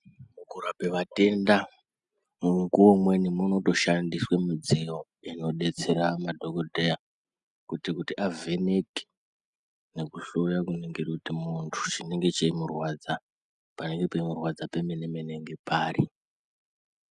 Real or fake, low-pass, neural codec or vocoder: real; 10.8 kHz; none